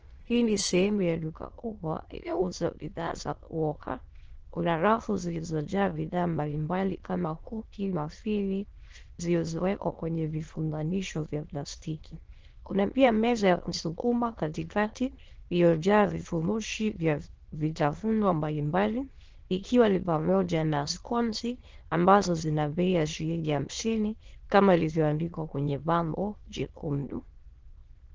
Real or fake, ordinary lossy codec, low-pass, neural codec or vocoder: fake; Opus, 16 kbps; 7.2 kHz; autoencoder, 22.05 kHz, a latent of 192 numbers a frame, VITS, trained on many speakers